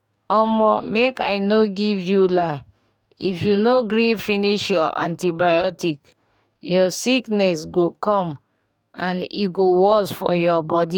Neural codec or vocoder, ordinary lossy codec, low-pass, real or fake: codec, 44.1 kHz, 2.6 kbps, DAC; none; 19.8 kHz; fake